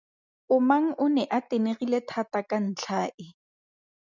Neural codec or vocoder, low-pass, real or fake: none; 7.2 kHz; real